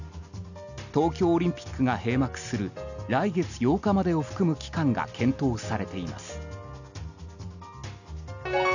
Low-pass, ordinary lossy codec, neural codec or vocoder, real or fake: 7.2 kHz; none; none; real